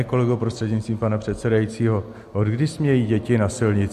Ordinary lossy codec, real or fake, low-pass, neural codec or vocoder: MP3, 64 kbps; real; 14.4 kHz; none